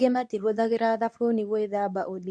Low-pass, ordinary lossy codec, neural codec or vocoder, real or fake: none; none; codec, 24 kHz, 0.9 kbps, WavTokenizer, medium speech release version 2; fake